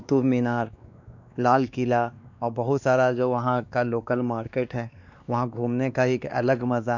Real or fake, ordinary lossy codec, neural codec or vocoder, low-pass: fake; none; codec, 16 kHz, 2 kbps, X-Codec, WavLM features, trained on Multilingual LibriSpeech; 7.2 kHz